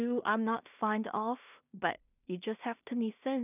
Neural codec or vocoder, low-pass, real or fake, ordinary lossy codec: codec, 16 kHz in and 24 kHz out, 0.4 kbps, LongCat-Audio-Codec, two codebook decoder; 3.6 kHz; fake; none